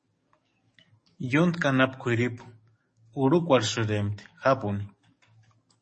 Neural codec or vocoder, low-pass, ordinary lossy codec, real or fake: codec, 44.1 kHz, 7.8 kbps, DAC; 10.8 kHz; MP3, 32 kbps; fake